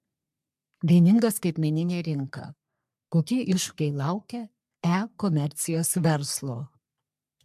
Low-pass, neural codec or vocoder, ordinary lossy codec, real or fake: 14.4 kHz; codec, 44.1 kHz, 3.4 kbps, Pupu-Codec; MP3, 96 kbps; fake